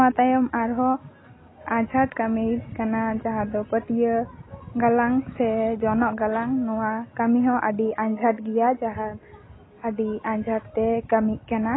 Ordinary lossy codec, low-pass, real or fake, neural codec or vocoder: AAC, 16 kbps; 7.2 kHz; real; none